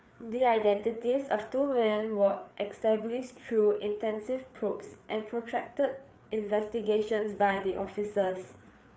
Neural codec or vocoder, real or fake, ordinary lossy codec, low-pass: codec, 16 kHz, 4 kbps, FreqCodec, larger model; fake; none; none